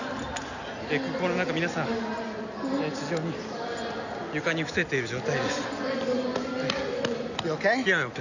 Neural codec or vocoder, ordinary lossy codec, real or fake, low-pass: none; none; real; 7.2 kHz